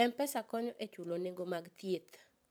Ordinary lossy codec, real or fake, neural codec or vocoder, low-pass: none; fake; vocoder, 44.1 kHz, 128 mel bands every 512 samples, BigVGAN v2; none